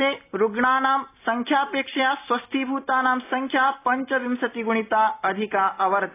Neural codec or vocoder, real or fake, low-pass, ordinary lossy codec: none; real; 3.6 kHz; AAC, 24 kbps